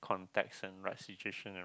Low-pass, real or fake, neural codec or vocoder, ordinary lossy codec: none; real; none; none